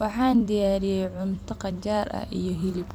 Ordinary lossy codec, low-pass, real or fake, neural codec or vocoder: none; 19.8 kHz; fake; vocoder, 44.1 kHz, 128 mel bands every 256 samples, BigVGAN v2